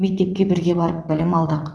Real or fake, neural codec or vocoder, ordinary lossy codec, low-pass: fake; vocoder, 22.05 kHz, 80 mel bands, WaveNeXt; none; none